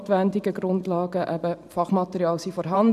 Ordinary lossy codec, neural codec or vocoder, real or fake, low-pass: none; vocoder, 44.1 kHz, 128 mel bands every 512 samples, BigVGAN v2; fake; 14.4 kHz